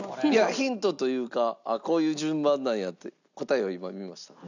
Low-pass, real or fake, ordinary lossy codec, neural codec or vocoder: 7.2 kHz; real; none; none